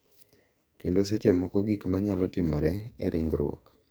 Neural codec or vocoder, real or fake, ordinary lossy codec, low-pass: codec, 44.1 kHz, 2.6 kbps, SNAC; fake; none; none